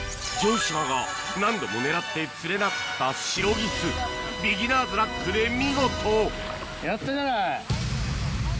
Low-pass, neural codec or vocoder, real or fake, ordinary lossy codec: none; none; real; none